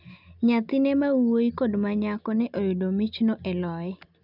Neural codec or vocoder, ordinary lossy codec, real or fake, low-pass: none; none; real; 5.4 kHz